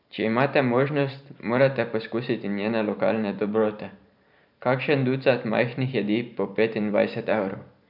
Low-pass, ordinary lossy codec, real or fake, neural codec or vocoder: 5.4 kHz; none; fake; vocoder, 44.1 kHz, 128 mel bands every 512 samples, BigVGAN v2